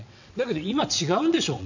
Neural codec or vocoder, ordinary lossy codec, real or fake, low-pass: vocoder, 22.05 kHz, 80 mel bands, WaveNeXt; none; fake; 7.2 kHz